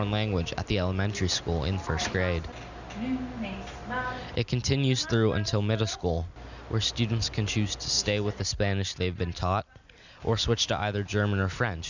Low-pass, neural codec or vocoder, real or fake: 7.2 kHz; none; real